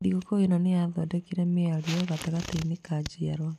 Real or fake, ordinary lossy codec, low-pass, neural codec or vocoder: real; none; 14.4 kHz; none